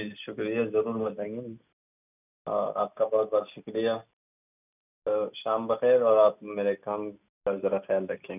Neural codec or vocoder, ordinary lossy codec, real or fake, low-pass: none; none; real; 3.6 kHz